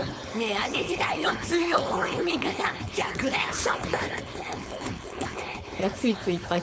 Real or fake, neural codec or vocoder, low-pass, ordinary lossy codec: fake; codec, 16 kHz, 4.8 kbps, FACodec; none; none